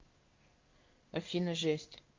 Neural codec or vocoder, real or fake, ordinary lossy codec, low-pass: codec, 16 kHz in and 24 kHz out, 1 kbps, XY-Tokenizer; fake; Opus, 24 kbps; 7.2 kHz